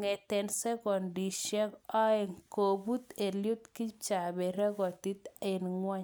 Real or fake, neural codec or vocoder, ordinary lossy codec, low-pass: real; none; none; none